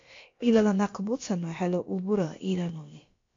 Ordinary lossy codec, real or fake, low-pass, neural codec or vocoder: AAC, 48 kbps; fake; 7.2 kHz; codec, 16 kHz, about 1 kbps, DyCAST, with the encoder's durations